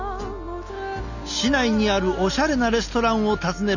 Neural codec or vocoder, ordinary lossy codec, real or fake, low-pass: none; none; real; 7.2 kHz